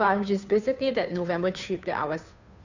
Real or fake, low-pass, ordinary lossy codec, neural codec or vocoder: fake; 7.2 kHz; none; codec, 16 kHz, 2 kbps, FunCodec, trained on Chinese and English, 25 frames a second